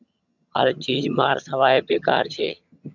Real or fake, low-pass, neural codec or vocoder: fake; 7.2 kHz; vocoder, 22.05 kHz, 80 mel bands, HiFi-GAN